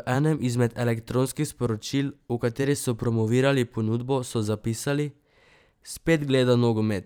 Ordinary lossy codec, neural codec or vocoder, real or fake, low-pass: none; vocoder, 44.1 kHz, 128 mel bands every 512 samples, BigVGAN v2; fake; none